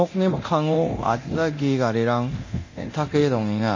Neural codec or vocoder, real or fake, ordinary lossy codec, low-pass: codec, 24 kHz, 0.9 kbps, DualCodec; fake; MP3, 32 kbps; 7.2 kHz